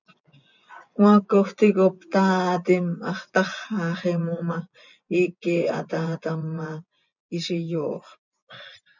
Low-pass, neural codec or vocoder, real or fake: 7.2 kHz; vocoder, 24 kHz, 100 mel bands, Vocos; fake